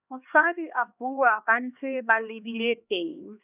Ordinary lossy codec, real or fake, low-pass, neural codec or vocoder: none; fake; 3.6 kHz; codec, 16 kHz, 2 kbps, X-Codec, HuBERT features, trained on LibriSpeech